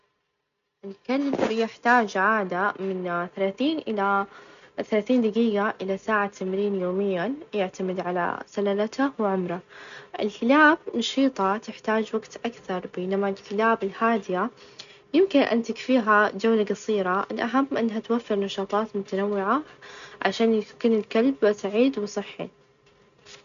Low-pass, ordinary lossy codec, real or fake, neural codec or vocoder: 7.2 kHz; none; real; none